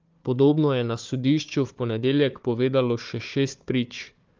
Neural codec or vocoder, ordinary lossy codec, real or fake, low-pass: codec, 16 kHz, 4 kbps, FunCodec, trained on Chinese and English, 50 frames a second; Opus, 32 kbps; fake; 7.2 kHz